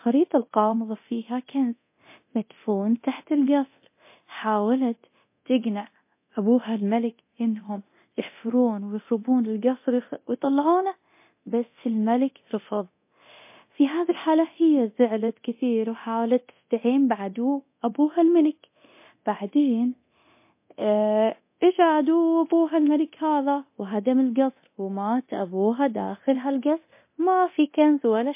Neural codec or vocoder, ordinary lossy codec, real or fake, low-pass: codec, 24 kHz, 0.9 kbps, DualCodec; MP3, 24 kbps; fake; 3.6 kHz